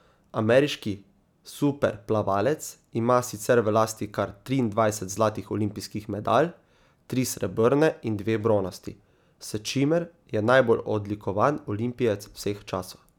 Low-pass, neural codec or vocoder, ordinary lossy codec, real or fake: 19.8 kHz; none; none; real